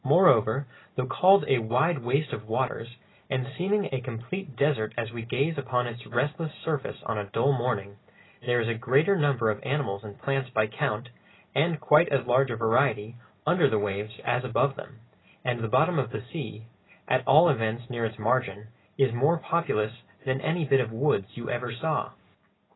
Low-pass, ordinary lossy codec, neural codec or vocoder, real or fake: 7.2 kHz; AAC, 16 kbps; none; real